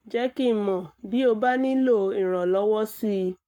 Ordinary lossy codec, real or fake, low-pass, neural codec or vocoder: none; fake; 19.8 kHz; codec, 44.1 kHz, 7.8 kbps, Pupu-Codec